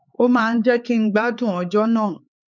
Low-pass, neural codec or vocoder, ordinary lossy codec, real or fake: 7.2 kHz; codec, 16 kHz, 4 kbps, X-Codec, HuBERT features, trained on LibriSpeech; none; fake